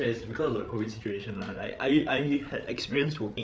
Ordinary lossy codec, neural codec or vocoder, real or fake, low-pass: none; codec, 16 kHz, 8 kbps, FreqCodec, larger model; fake; none